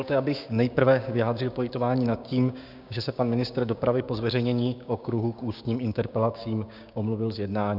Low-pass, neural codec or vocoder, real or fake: 5.4 kHz; codec, 16 kHz, 6 kbps, DAC; fake